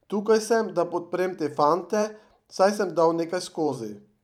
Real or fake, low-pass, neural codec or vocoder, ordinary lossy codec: fake; 19.8 kHz; vocoder, 44.1 kHz, 128 mel bands every 512 samples, BigVGAN v2; none